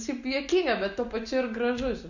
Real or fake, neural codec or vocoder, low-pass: real; none; 7.2 kHz